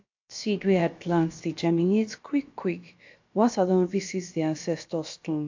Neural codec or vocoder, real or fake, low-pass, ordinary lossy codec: codec, 16 kHz, about 1 kbps, DyCAST, with the encoder's durations; fake; 7.2 kHz; AAC, 48 kbps